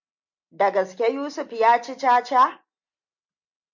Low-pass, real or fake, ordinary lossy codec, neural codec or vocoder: 7.2 kHz; real; MP3, 48 kbps; none